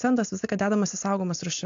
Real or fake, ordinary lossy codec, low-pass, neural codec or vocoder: real; AAC, 64 kbps; 7.2 kHz; none